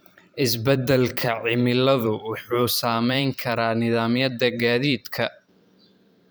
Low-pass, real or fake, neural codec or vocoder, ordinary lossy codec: none; fake; vocoder, 44.1 kHz, 128 mel bands every 512 samples, BigVGAN v2; none